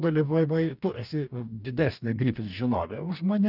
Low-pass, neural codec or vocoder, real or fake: 5.4 kHz; codec, 44.1 kHz, 2.6 kbps, DAC; fake